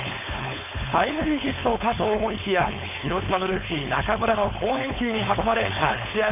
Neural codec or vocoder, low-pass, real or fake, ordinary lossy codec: codec, 16 kHz, 4.8 kbps, FACodec; 3.6 kHz; fake; none